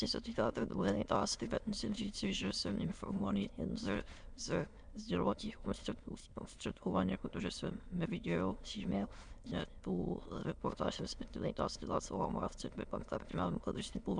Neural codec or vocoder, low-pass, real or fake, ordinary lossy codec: autoencoder, 22.05 kHz, a latent of 192 numbers a frame, VITS, trained on many speakers; 9.9 kHz; fake; MP3, 96 kbps